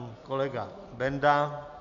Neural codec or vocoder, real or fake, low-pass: none; real; 7.2 kHz